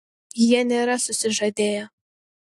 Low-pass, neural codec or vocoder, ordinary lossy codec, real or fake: 14.4 kHz; none; AAC, 64 kbps; real